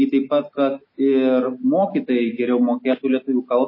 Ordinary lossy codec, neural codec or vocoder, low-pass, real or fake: MP3, 24 kbps; none; 5.4 kHz; real